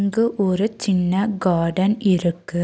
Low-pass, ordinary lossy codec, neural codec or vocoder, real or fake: none; none; none; real